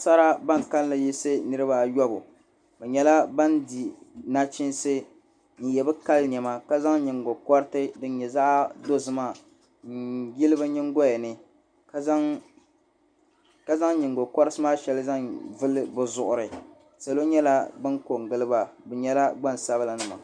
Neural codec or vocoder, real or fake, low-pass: none; real; 9.9 kHz